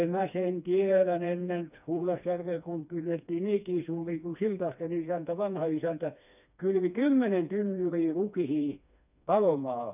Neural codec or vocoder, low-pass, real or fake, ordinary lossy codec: codec, 16 kHz, 2 kbps, FreqCodec, smaller model; 3.6 kHz; fake; none